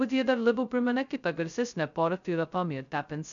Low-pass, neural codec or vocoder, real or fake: 7.2 kHz; codec, 16 kHz, 0.2 kbps, FocalCodec; fake